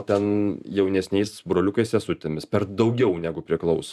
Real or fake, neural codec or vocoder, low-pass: fake; vocoder, 44.1 kHz, 128 mel bands every 512 samples, BigVGAN v2; 14.4 kHz